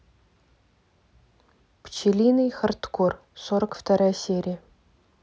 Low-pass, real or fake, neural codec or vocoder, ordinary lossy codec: none; real; none; none